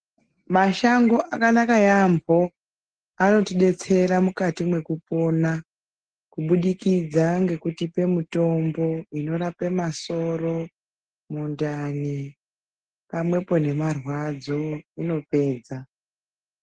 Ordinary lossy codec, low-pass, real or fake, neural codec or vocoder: Opus, 16 kbps; 9.9 kHz; real; none